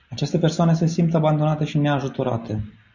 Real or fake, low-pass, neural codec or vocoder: real; 7.2 kHz; none